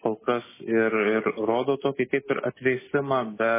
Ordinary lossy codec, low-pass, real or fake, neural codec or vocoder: MP3, 16 kbps; 3.6 kHz; real; none